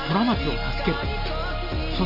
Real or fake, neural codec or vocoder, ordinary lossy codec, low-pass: real; none; none; 5.4 kHz